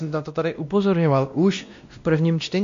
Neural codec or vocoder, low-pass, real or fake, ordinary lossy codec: codec, 16 kHz, 1 kbps, X-Codec, WavLM features, trained on Multilingual LibriSpeech; 7.2 kHz; fake; MP3, 48 kbps